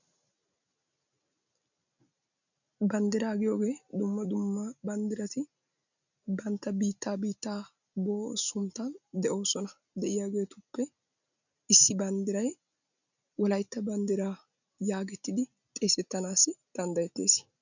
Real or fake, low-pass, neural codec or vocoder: real; 7.2 kHz; none